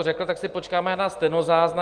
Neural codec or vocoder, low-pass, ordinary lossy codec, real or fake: none; 9.9 kHz; Opus, 24 kbps; real